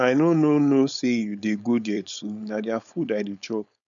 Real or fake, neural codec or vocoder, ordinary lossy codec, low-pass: fake; codec, 16 kHz, 4.8 kbps, FACodec; none; 7.2 kHz